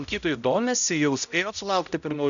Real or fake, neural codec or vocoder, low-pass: fake; codec, 16 kHz, 0.5 kbps, X-Codec, HuBERT features, trained on balanced general audio; 7.2 kHz